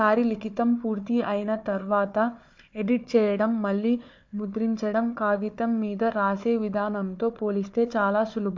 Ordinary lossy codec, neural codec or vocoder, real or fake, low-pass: MP3, 48 kbps; codec, 16 kHz, 4 kbps, FunCodec, trained on Chinese and English, 50 frames a second; fake; 7.2 kHz